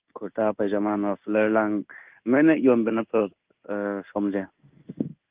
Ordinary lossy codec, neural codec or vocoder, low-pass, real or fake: Opus, 24 kbps; codec, 16 kHz in and 24 kHz out, 1 kbps, XY-Tokenizer; 3.6 kHz; fake